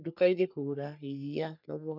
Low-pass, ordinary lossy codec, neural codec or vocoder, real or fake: 5.4 kHz; none; codec, 44.1 kHz, 3.4 kbps, Pupu-Codec; fake